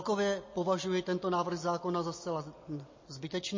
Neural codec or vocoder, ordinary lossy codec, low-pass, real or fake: none; MP3, 32 kbps; 7.2 kHz; real